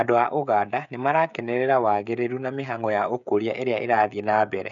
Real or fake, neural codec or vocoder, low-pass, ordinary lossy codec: fake; codec, 16 kHz, 16 kbps, FreqCodec, smaller model; 7.2 kHz; none